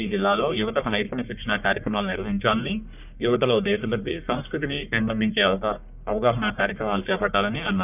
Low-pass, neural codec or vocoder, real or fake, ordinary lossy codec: 3.6 kHz; codec, 44.1 kHz, 1.7 kbps, Pupu-Codec; fake; none